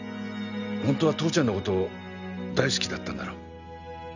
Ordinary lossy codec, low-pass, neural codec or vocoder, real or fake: none; 7.2 kHz; none; real